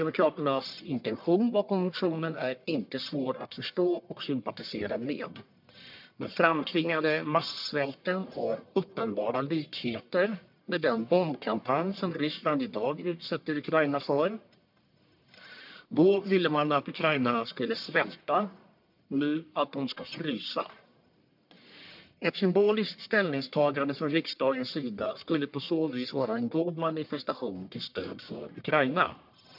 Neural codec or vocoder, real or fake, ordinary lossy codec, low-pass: codec, 44.1 kHz, 1.7 kbps, Pupu-Codec; fake; MP3, 48 kbps; 5.4 kHz